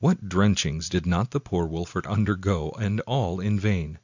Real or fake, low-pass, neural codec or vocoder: real; 7.2 kHz; none